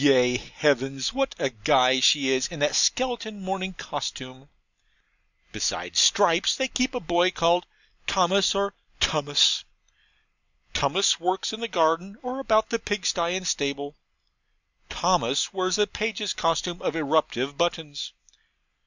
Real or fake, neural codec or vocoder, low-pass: real; none; 7.2 kHz